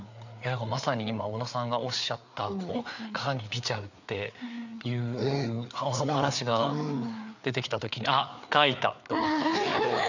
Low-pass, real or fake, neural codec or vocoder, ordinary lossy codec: 7.2 kHz; fake; codec, 16 kHz, 4 kbps, FreqCodec, larger model; none